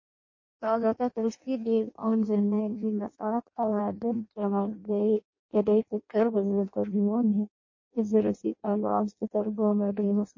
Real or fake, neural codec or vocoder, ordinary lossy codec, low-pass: fake; codec, 16 kHz in and 24 kHz out, 0.6 kbps, FireRedTTS-2 codec; MP3, 32 kbps; 7.2 kHz